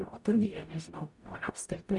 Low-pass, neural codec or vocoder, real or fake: 10.8 kHz; codec, 44.1 kHz, 0.9 kbps, DAC; fake